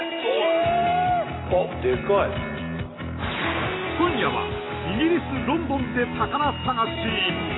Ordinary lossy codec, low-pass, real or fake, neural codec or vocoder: AAC, 16 kbps; 7.2 kHz; real; none